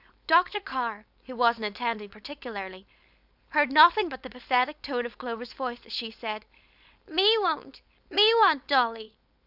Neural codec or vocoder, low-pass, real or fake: codec, 16 kHz, 4.8 kbps, FACodec; 5.4 kHz; fake